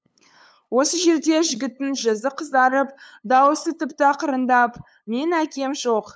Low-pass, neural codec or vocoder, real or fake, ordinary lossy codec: none; codec, 16 kHz, 8 kbps, FunCodec, trained on LibriTTS, 25 frames a second; fake; none